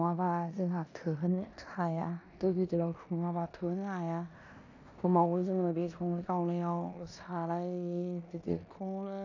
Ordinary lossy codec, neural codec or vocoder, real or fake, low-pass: AAC, 48 kbps; codec, 16 kHz in and 24 kHz out, 0.9 kbps, LongCat-Audio-Codec, four codebook decoder; fake; 7.2 kHz